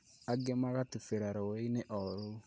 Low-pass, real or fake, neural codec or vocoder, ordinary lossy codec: none; real; none; none